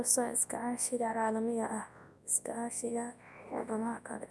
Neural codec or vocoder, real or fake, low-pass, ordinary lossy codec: codec, 24 kHz, 0.9 kbps, WavTokenizer, large speech release; fake; none; none